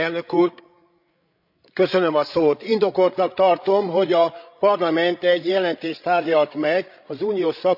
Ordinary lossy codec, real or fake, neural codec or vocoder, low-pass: none; fake; codec, 16 kHz, 16 kbps, FreqCodec, larger model; 5.4 kHz